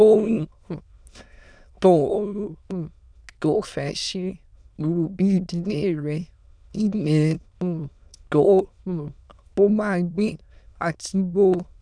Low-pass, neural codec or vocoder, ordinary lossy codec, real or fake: 9.9 kHz; autoencoder, 22.05 kHz, a latent of 192 numbers a frame, VITS, trained on many speakers; none; fake